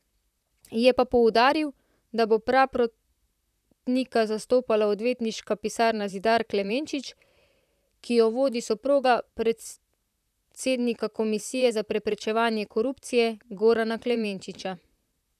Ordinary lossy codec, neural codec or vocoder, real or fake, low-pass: none; vocoder, 44.1 kHz, 128 mel bands, Pupu-Vocoder; fake; 14.4 kHz